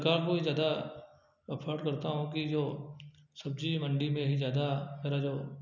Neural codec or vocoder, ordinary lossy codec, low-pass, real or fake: none; none; 7.2 kHz; real